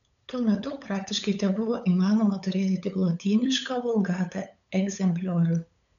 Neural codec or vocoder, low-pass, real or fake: codec, 16 kHz, 8 kbps, FunCodec, trained on LibriTTS, 25 frames a second; 7.2 kHz; fake